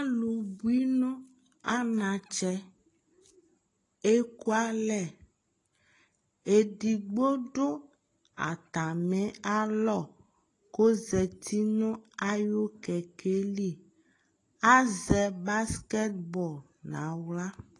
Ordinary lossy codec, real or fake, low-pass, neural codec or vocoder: AAC, 32 kbps; real; 10.8 kHz; none